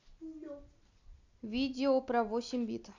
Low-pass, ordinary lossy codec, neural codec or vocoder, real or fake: 7.2 kHz; Opus, 64 kbps; none; real